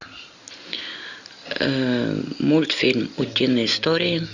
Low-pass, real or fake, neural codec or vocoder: 7.2 kHz; real; none